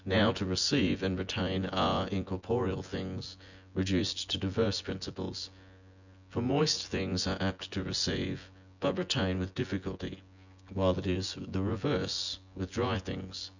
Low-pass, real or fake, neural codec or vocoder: 7.2 kHz; fake; vocoder, 24 kHz, 100 mel bands, Vocos